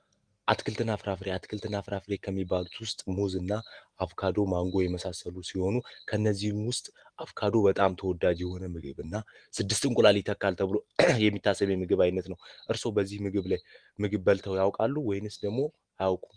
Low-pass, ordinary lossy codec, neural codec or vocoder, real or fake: 9.9 kHz; Opus, 24 kbps; none; real